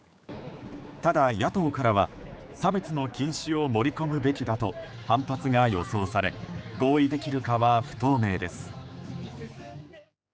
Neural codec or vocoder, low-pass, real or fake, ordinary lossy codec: codec, 16 kHz, 4 kbps, X-Codec, HuBERT features, trained on general audio; none; fake; none